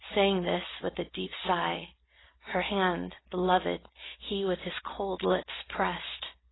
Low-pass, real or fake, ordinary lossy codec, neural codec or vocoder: 7.2 kHz; real; AAC, 16 kbps; none